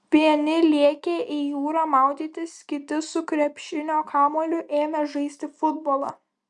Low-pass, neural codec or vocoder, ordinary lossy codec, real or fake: 10.8 kHz; none; Opus, 64 kbps; real